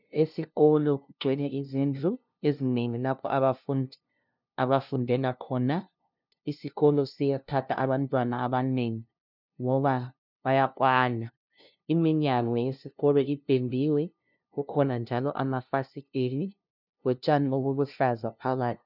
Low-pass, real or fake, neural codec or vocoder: 5.4 kHz; fake; codec, 16 kHz, 0.5 kbps, FunCodec, trained on LibriTTS, 25 frames a second